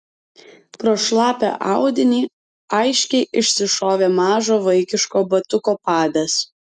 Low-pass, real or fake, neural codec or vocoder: 9.9 kHz; real; none